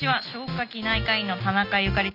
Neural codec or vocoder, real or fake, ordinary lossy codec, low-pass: none; real; none; 5.4 kHz